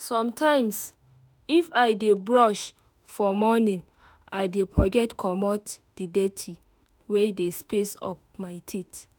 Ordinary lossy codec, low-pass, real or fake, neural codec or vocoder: none; none; fake; autoencoder, 48 kHz, 32 numbers a frame, DAC-VAE, trained on Japanese speech